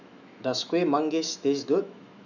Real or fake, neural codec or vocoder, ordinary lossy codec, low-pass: fake; autoencoder, 48 kHz, 128 numbers a frame, DAC-VAE, trained on Japanese speech; none; 7.2 kHz